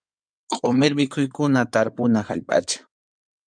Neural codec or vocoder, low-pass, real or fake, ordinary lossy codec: codec, 16 kHz in and 24 kHz out, 2.2 kbps, FireRedTTS-2 codec; 9.9 kHz; fake; MP3, 96 kbps